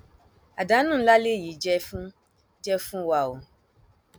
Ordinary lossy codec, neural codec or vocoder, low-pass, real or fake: none; none; none; real